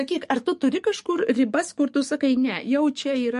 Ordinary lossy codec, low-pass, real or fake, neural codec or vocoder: MP3, 48 kbps; 14.4 kHz; fake; codec, 44.1 kHz, 7.8 kbps, DAC